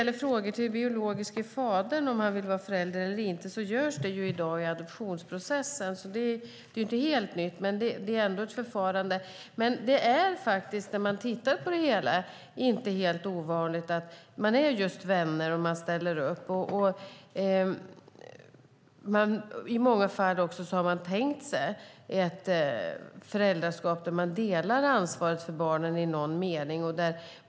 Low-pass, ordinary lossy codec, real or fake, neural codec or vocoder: none; none; real; none